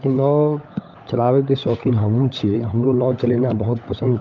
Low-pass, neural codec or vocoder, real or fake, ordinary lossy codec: 7.2 kHz; codec, 16 kHz, 16 kbps, FunCodec, trained on LibriTTS, 50 frames a second; fake; Opus, 32 kbps